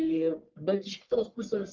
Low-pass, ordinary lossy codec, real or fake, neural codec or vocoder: 7.2 kHz; Opus, 32 kbps; fake; codec, 44.1 kHz, 1.7 kbps, Pupu-Codec